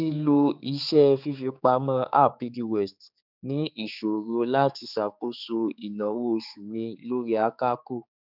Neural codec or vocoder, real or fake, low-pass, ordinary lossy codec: codec, 16 kHz, 4 kbps, X-Codec, HuBERT features, trained on general audio; fake; 5.4 kHz; none